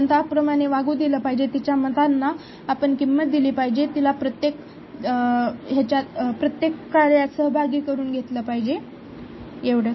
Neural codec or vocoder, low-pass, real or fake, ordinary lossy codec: none; 7.2 kHz; real; MP3, 24 kbps